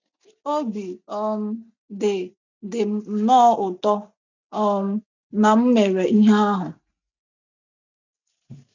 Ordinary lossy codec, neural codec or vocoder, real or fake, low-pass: none; none; real; 7.2 kHz